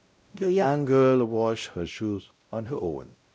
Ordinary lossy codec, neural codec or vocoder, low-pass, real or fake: none; codec, 16 kHz, 0.5 kbps, X-Codec, WavLM features, trained on Multilingual LibriSpeech; none; fake